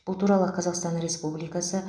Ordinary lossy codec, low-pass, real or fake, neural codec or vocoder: none; 9.9 kHz; real; none